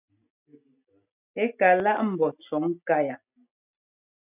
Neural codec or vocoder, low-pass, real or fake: none; 3.6 kHz; real